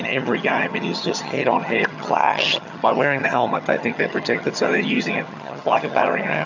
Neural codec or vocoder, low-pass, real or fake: vocoder, 22.05 kHz, 80 mel bands, HiFi-GAN; 7.2 kHz; fake